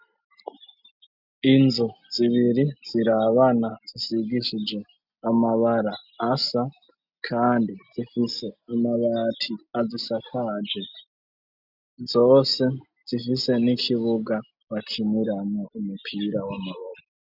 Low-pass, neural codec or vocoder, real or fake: 5.4 kHz; none; real